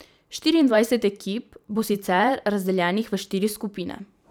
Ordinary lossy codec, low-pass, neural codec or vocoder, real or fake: none; none; vocoder, 44.1 kHz, 128 mel bands every 512 samples, BigVGAN v2; fake